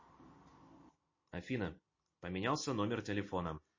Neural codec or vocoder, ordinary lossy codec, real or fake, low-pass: none; MP3, 32 kbps; real; 7.2 kHz